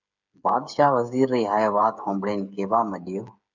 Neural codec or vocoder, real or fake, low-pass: codec, 16 kHz, 8 kbps, FreqCodec, smaller model; fake; 7.2 kHz